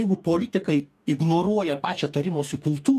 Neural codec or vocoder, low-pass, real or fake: codec, 44.1 kHz, 2.6 kbps, DAC; 14.4 kHz; fake